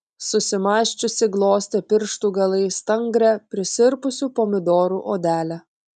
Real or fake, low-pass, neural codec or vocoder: real; 9.9 kHz; none